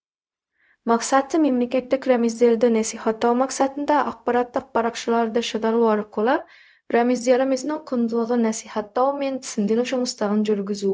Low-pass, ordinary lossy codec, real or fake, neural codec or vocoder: none; none; fake; codec, 16 kHz, 0.4 kbps, LongCat-Audio-Codec